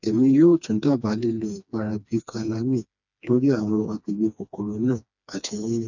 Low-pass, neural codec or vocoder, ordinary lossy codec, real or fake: 7.2 kHz; codec, 16 kHz, 2 kbps, FreqCodec, smaller model; none; fake